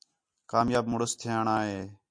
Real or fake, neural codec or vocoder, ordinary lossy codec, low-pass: real; none; MP3, 64 kbps; 9.9 kHz